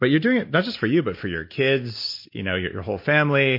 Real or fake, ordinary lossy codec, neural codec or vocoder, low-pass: real; MP3, 32 kbps; none; 5.4 kHz